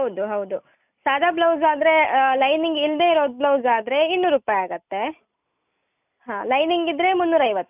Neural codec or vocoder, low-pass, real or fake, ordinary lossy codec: none; 3.6 kHz; real; none